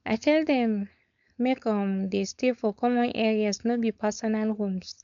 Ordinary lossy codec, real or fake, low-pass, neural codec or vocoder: MP3, 96 kbps; fake; 7.2 kHz; codec, 16 kHz, 4.8 kbps, FACodec